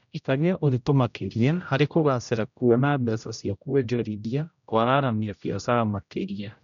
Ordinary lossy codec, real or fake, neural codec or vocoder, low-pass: none; fake; codec, 16 kHz, 0.5 kbps, X-Codec, HuBERT features, trained on general audio; 7.2 kHz